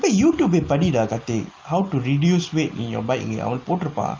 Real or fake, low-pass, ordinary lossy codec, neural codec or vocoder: real; 7.2 kHz; Opus, 24 kbps; none